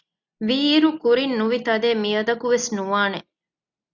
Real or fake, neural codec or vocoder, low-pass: real; none; 7.2 kHz